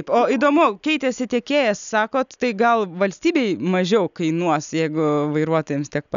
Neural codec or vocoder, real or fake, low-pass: none; real; 7.2 kHz